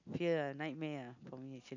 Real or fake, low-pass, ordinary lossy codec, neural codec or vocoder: real; 7.2 kHz; none; none